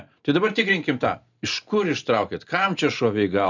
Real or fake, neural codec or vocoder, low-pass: real; none; 7.2 kHz